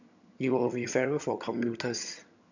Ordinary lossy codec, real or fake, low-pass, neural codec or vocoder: none; fake; 7.2 kHz; vocoder, 22.05 kHz, 80 mel bands, HiFi-GAN